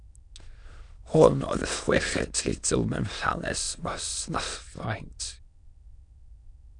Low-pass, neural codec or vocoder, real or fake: 9.9 kHz; autoencoder, 22.05 kHz, a latent of 192 numbers a frame, VITS, trained on many speakers; fake